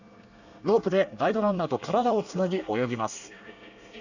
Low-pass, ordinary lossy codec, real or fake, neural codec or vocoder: 7.2 kHz; none; fake; codec, 24 kHz, 1 kbps, SNAC